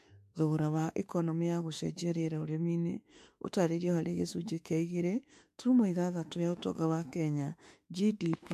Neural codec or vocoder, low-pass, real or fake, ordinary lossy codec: autoencoder, 48 kHz, 32 numbers a frame, DAC-VAE, trained on Japanese speech; 14.4 kHz; fake; MP3, 64 kbps